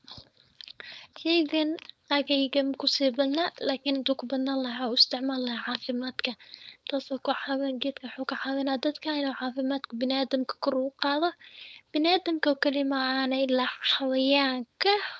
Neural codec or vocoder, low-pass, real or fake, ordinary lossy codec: codec, 16 kHz, 4.8 kbps, FACodec; none; fake; none